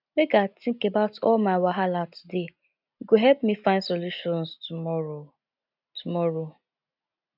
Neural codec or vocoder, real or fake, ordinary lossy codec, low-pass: none; real; none; 5.4 kHz